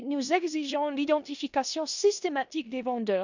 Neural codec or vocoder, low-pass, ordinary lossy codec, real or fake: codec, 16 kHz in and 24 kHz out, 0.9 kbps, LongCat-Audio-Codec, four codebook decoder; 7.2 kHz; none; fake